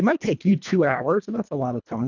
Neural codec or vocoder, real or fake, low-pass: codec, 24 kHz, 1.5 kbps, HILCodec; fake; 7.2 kHz